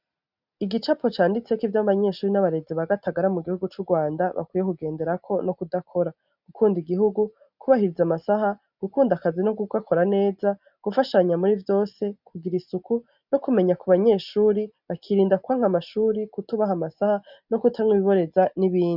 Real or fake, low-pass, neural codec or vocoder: real; 5.4 kHz; none